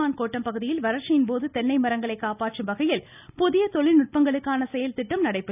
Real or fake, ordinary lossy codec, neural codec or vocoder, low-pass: real; none; none; 3.6 kHz